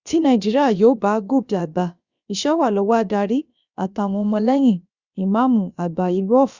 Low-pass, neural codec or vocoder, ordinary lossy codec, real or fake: 7.2 kHz; codec, 16 kHz, about 1 kbps, DyCAST, with the encoder's durations; Opus, 64 kbps; fake